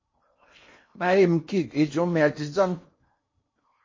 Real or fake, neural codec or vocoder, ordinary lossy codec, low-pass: fake; codec, 16 kHz in and 24 kHz out, 0.8 kbps, FocalCodec, streaming, 65536 codes; MP3, 32 kbps; 7.2 kHz